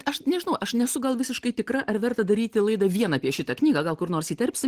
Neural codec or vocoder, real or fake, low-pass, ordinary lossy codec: none; real; 14.4 kHz; Opus, 16 kbps